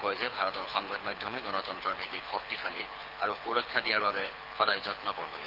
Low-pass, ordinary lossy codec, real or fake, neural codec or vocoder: 5.4 kHz; Opus, 24 kbps; fake; codec, 24 kHz, 6 kbps, HILCodec